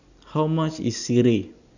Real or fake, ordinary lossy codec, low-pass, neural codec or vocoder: real; none; 7.2 kHz; none